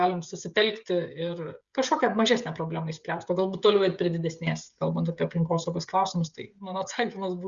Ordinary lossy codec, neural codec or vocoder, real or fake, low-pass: Opus, 64 kbps; codec, 16 kHz, 16 kbps, FreqCodec, smaller model; fake; 7.2 kHz